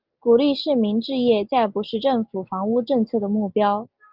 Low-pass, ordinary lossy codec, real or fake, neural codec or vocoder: 5.4 kHz; Opus, 32 kbps; real; none